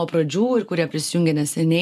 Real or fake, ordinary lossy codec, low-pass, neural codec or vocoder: real; MP3, 96 kbps; 14.4 kHz; none